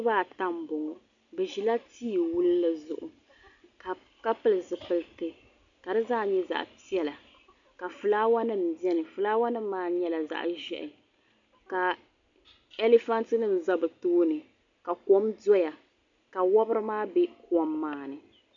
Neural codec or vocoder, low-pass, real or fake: none; 7.2 kHz; real